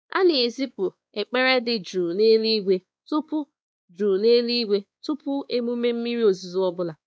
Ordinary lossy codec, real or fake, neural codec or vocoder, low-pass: none; fake; codec, 16 kHz, 2 kbps, X-Codec, WavLM features, trained on Multilingual LibriSpeech; none